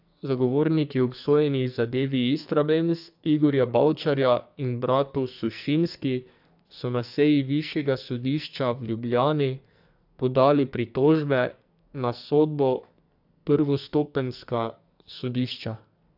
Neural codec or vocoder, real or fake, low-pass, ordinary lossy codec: codec, 44.1 kHz, 2.6 kbps, SNAC; fake; 5.4 kHz; AAC, 48 kbps